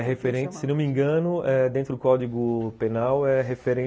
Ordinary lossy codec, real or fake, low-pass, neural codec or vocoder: none; real; none; none